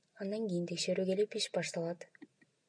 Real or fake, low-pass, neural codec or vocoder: real; 9.9 kHz; none